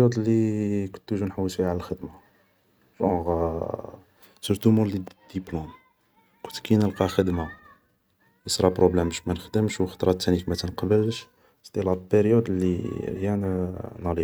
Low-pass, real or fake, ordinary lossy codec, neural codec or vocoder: none; real; none; none